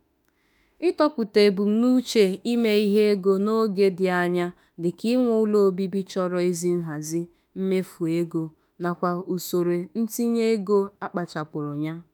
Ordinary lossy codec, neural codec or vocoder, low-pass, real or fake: none; autoencoder, 48 kHz, 32 numbers a frame, DAC-VAE, trained on Japanese speech; none; fake